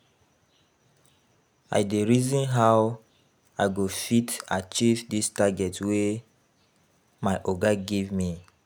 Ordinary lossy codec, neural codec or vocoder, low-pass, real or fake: none; none; none; real